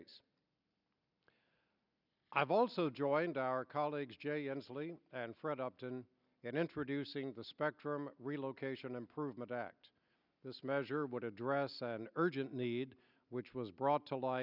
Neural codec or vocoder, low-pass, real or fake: none; 5.4 kHz; real